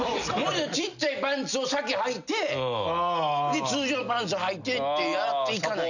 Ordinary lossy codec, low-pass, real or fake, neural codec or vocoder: none; 7.2 kHz; real; none